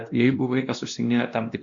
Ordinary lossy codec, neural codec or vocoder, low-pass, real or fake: Opus, 64 kbps; codec, 16 kHz, 1 kbps, X-Codec, WavLM features, trained on Multilingual LibriSpeech; 7.2 kHz; fake